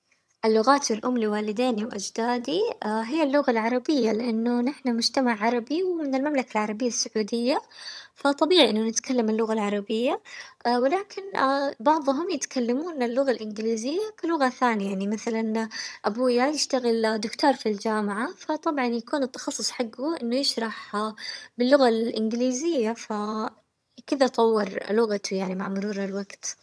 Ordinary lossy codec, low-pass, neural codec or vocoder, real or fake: none; none; vocoder, 22.05 kHz, 80 mel bands, HiFi-GAN; fake